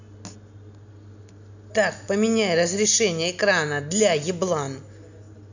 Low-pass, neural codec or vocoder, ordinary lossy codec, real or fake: 7.2 kHz; none; none; real